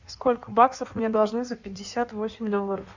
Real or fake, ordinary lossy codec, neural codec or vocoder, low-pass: fake; Opus, 64 kbps; codec, 16 kHz in and 24 kHz out, 1.1 kbps, FireRedTTS-2 codec; 7.2 kHz